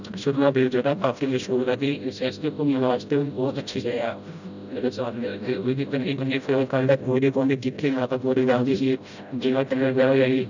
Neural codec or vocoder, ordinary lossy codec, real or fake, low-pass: codec, 16 kHz, 0.5 kbps, FreqCodec, smaller model; none; fake; 7.2 kHz